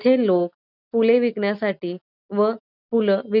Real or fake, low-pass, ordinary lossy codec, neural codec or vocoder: real; 5.4 kHz; none; none